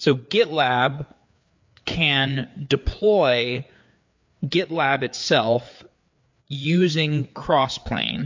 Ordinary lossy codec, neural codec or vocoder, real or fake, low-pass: MP3, 48 kbps; codec, 16 kHz, 4 kbps, FreqCodec, larger model; fake; 7.2 kHz